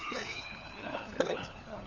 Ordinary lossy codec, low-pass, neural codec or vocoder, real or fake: none; 7.2 kHz; codec, 16 kHz, 4 kbps, FunCodec, trained on LibriTTS, 50 frames a second; fake